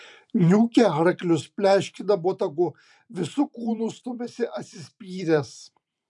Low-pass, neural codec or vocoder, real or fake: 10.8 kHz; vocoder, 44.1 kHz, 128 mel bands every 512 samples, BigVGAN v2; fake